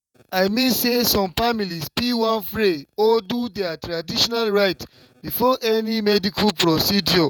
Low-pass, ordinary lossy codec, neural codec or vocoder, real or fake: 19.8 kHz; none; vocoder, 48 kHz, 128 mel bands, Vocos; fake